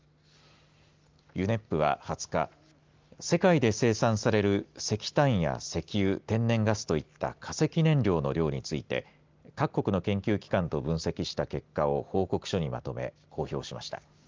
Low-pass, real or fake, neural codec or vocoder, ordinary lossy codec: 7.2 kHz; real; none; Opus, 24 kbps